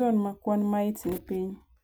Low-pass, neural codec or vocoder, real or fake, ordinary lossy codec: none; none; real; none